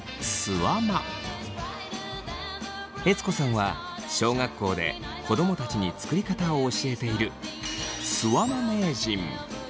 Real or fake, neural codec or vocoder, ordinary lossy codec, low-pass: real; none; none; none